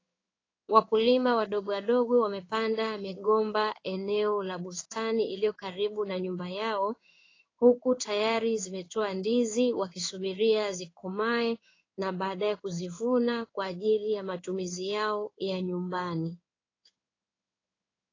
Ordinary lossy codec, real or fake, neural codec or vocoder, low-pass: AAC, 32 kbps; fake; codec, 16 kHz in and 24 kHz out, 1 kbps, XY-Tokenizer; 7.2 kHz